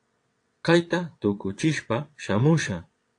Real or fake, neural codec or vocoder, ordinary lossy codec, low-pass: fake; vocoder, 22.05 kHz, 80 mel bands, WaveNeXt; AAC, 48 kbps; 9.9 kHz